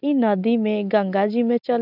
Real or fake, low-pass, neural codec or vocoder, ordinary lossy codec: real; 5.4 kHz; none; none